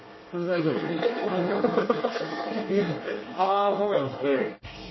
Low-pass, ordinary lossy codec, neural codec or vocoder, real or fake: 7.2 kHz; MP3, 24 kbps; codec, 24 kHz, 1 kbps, SNAC; fake